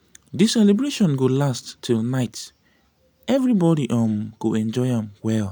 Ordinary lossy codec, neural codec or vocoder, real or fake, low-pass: none; none; real; none